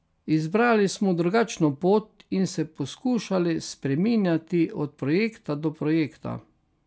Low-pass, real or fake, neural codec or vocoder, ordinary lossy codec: none; real; none; none